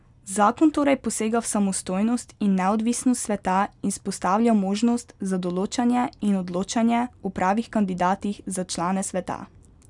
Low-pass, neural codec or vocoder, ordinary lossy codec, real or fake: 10.8 kHz; none; none; real